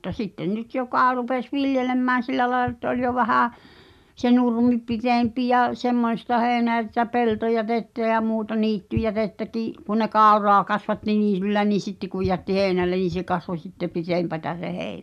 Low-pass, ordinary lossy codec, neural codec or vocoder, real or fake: 14.4 kHz; none; none; real